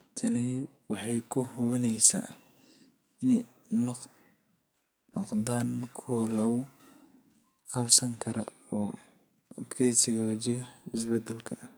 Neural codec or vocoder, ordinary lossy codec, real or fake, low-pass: codec, 44.1 kHz, 2.6 kbps, SNAC; none; fake; none